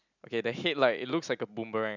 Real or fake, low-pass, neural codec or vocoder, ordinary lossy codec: real; 7.2 kHz; none; none